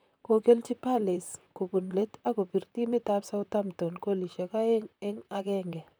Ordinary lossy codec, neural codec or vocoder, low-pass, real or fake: none; vocoder, 44.1 kHz, 128 mel bands, Pupu-Vocoder; none; fake